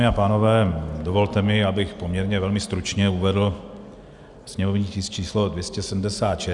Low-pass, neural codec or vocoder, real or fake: 10.8 kHz; none; real